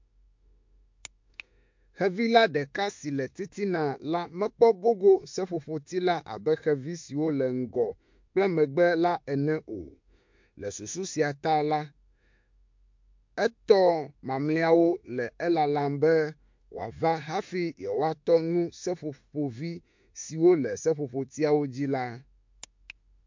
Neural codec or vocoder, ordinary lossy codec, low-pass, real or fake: autoencoder, 48 kHz, 32 numbers a frame, DAC-VAE, trained on Japanese speech; MP3, 48 kbps; 7.2 kHz; fake